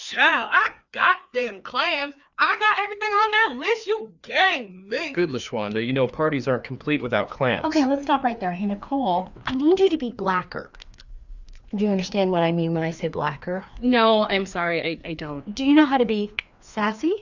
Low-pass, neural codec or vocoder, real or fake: 7.2 kHz; codec, 16 kHz, 2 kbps, FreqCodec, larger model; fake